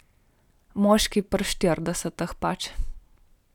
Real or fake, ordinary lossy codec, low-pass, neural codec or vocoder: real; none; 19.8 kHz; none